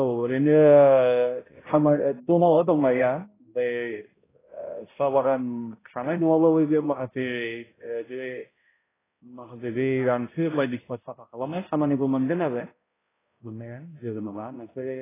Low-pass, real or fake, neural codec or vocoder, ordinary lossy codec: 3.6 kHz; fake; codec, 16 kHz, 0.5 kbps, X-Codec, HuBERT features, trained on balanced general audio; AAC, 16 kbps